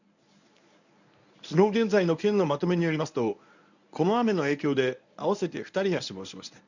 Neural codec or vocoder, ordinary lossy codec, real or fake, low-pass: codec, 24 kHz, 0.9 kbps, WavTokenizer, medium speech release version 1; none; fake; 7.2 kHz